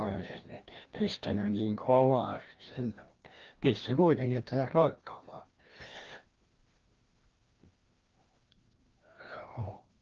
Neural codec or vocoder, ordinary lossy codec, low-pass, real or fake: codec, 16 kHz, 1 kbps, FreqCodec, larger model; Opus, 16 kbps; 7.2 kHz; fake